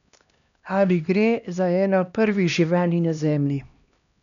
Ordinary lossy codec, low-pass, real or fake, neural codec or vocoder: none; 7.2 kHz; fake; codec, 16 kHz, 1 kbps, X-Codec, HuBERT features, trained on LibriSpeech